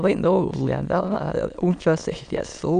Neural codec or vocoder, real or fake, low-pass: autoencoder, 22.05 kHz, a latent of 192 numbers a frame, VITS, trained on many speakers; fake; 9.9 kHz